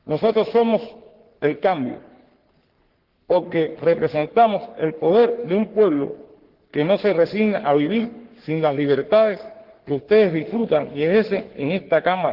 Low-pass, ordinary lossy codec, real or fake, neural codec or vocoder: 5.4 kHz; Opus, 16 kbps; fake; codec, 44.1 kHz, 3.4 kbps, Pupu-Codec